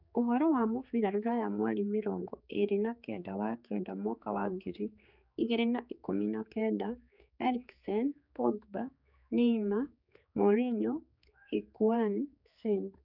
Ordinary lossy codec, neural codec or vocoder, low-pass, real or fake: none; codec, 16 kHz, 4 kbps, X-Codec, HuBERT features, trained on general audio; 5.4 kHz; fake